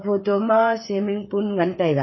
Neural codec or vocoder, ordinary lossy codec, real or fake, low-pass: codec, 16 kHz, 8 kbps, FreqCodec, smaller model; MP3, 24 kbps; fake; 7.2 kHz